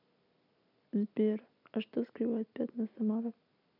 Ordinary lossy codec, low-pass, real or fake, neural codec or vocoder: none; 5.4 kHz; real; none